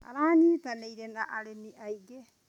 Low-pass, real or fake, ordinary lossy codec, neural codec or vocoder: 19.8 kHz; real; none; none